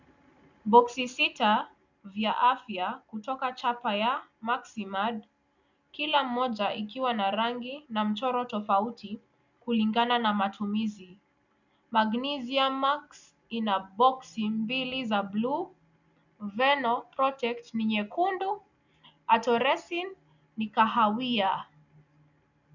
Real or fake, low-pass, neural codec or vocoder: real; 7.2 kHz; none